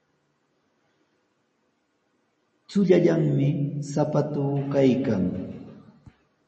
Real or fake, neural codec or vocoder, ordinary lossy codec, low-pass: real; none; MP3, 32 kbps; 10.8 kHz